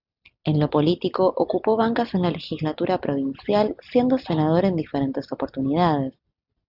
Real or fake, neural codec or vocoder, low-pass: real; none; 5.4 kHz